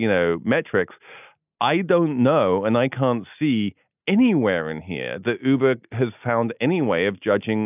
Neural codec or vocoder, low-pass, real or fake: none; 3.6 kHz; real